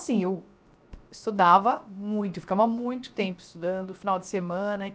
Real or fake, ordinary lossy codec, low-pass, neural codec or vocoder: fake; none; none; codec, 16 kHz, 0.7 kbps, FocalCodec